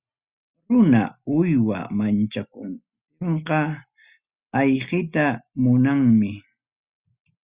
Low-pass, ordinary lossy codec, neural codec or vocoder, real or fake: 3.6 kHz; Opus, 64 kbps; none; real